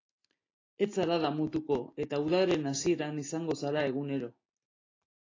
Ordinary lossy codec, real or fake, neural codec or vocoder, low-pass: AAC, 32 kbps; real; none; 7.2 kHz